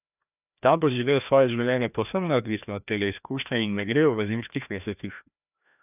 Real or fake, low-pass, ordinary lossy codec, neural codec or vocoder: fake; 3.6 kHz; none; codec, 16 kHz, 1 kbps, FreqCodec, larger model